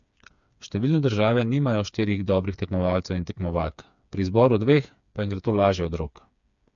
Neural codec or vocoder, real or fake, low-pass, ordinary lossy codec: codec, 16 kHz, 4 kbps, FreqCodec, smaller model; fake; 7.2 kHz; MP3, 64 kbps